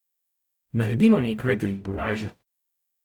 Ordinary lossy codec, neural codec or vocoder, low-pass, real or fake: none; codec, 44.1 kHz, 0.9 kbps, DAC; 19.8 kHz; fake